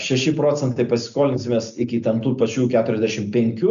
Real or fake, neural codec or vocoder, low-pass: real; none; 7.2 kHz